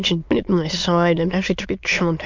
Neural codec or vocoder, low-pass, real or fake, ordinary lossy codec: autoencoder, 22.05 kHz, a latent of 192 numbers a frame, VITS, trained on many speakers; 7.2 kHz; fake; MP3, 64 kbps